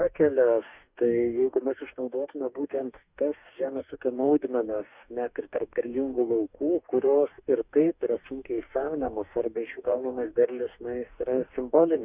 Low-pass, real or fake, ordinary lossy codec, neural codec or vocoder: 3.6 kHz; fake; Opus, 64 kbps; codec, 44.1 kHz, 2.6 kbps, DAC